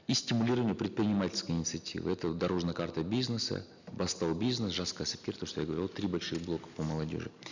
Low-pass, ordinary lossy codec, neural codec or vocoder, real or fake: 7.2 kHz; none; none; real